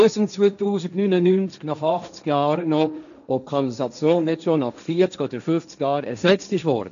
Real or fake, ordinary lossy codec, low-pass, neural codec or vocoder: fake; none; 7.2 kHz; codec, 16 kHz, 1.1 kbps, Voila-Tokenizer